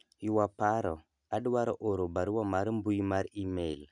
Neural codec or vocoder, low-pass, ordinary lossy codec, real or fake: none; 10.8 kHz; none; real